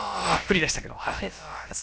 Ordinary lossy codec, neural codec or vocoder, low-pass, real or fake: none; codec, 16 kHz, about 1 kbps, DyCAST, with the encoder's durations; none; fake